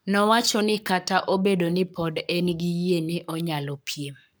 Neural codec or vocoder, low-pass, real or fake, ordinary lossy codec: codec, 44.1 kHz, 7.8 kbps, Pupu-Codec; none; fake; none